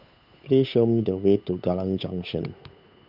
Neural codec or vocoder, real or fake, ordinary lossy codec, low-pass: codec, 16 kHz, 8 kbps, FunCodec, trained on Chinese and English, 25 frames a second; fake; none; 5.4 kHz